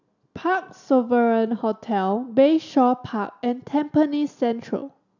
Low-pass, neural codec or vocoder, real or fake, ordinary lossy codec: 7.2 kHz; none; real; none